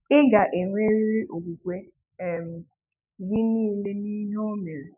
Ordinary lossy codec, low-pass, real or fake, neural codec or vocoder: none; 3.6 kHz; fake; autoencoder, 48 kHz, 128 numbers a frame, DAC-VAE, trained on Japanese speech